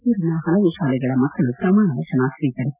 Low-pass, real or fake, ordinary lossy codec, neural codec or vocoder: 3.6 kHz; real; none; none